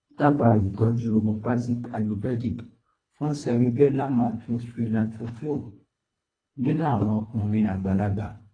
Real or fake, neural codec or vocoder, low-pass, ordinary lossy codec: fake; codec, 24 kHz, 1.5 kbps, HILCodec; 9.9 kHz; AAC, 32 kbps